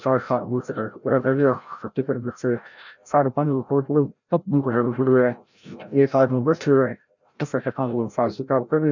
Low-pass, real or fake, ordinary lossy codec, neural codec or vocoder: 7.2 kHz; fake; none; codec, 16 kHz, 0.5 kbps, FreqCodec, larger model